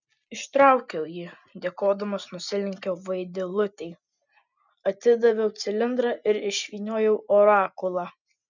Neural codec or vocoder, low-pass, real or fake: none; 7.2 kHz; real